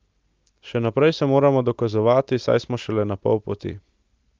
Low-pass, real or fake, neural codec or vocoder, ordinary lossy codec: 7.2 kHz; real; none; Opus, 24 kbps